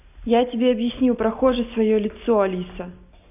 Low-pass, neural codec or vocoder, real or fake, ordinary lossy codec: 3.6 kHz; none; real; none